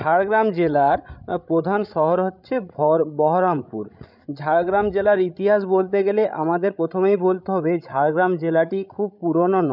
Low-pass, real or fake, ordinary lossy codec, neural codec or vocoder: 5.4 kHz; fake; none; codec, 16 kHz, 16 kbps, FreqCodec, larger model